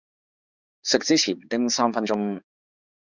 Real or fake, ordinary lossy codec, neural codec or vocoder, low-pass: fake; Opus, 64 kbps; codec, 16 kHz, 4 kbps, X-Codec, HuBERT features, trained on balanced general audio; 7.2 kHz